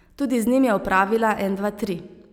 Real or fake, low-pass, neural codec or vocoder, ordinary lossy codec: real; 19.8 kHz; none; none